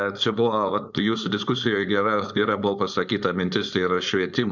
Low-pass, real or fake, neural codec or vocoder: 7.2 kHz; fake; codec, 16 kHz, 4.8 kbps, FACodec